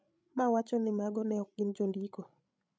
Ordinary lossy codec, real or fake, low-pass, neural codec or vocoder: none; fake; none; codec, 16 kHz, 16 kbps, FreqCodec, larger model